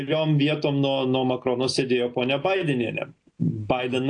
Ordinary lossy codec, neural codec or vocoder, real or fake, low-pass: AAC, 48 kbps; none; real; 9.9 kHz